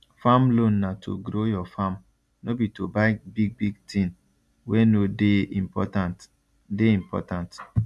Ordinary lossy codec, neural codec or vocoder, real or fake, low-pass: none; none; real; none